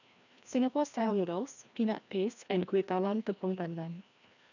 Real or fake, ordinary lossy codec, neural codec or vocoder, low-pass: fake; none; codec, 16 kHz, 1 kbps, FreqCodec, larger model; 7.2 kHz